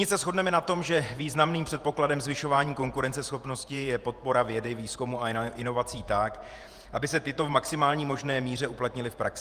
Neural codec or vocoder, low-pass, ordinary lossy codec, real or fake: vocoder, 48 kHz, 128 mel bands, Vocos; 14.4 kHz; Opus, 32 kbps; fake